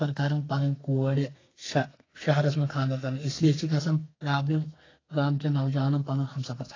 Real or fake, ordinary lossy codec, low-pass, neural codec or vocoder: fake; AAC, 32 kbps; 7.2 kHz; codec, 32 kHz, 1.9 kbps, SNAC